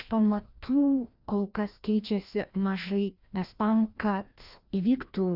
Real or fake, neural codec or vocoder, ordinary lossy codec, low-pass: fake; codec, 16 kHz, 1 kbps, FreqCodec, larger model; Opus, 64 kbps; 5.4 kHz